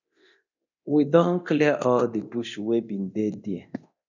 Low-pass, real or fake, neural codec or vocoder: 7.2 kHz; fake; codec, 24 kHz, 0.9 kbps, DualCodec